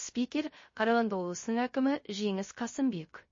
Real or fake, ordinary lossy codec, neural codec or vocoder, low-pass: fake; MP3, 32 kbps; codec, 16 kHz, 0.3 kbps, FocalCodec; 7.2 kHz